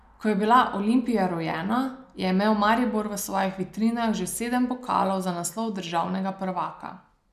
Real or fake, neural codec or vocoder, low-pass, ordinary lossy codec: real; none; 14.4 kHz; none